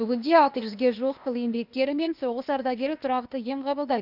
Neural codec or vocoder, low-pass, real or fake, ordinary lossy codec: codec, 16 kHz, 0.8 kbps, ZipCodec; 5.4 kHz; fake; none